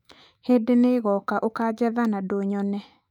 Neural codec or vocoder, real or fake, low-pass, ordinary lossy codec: autoencoder, 48 kHz, 128 numbers a frame, DAC-VAE, trained on Japanese speech; fake; 19.8 kHz; none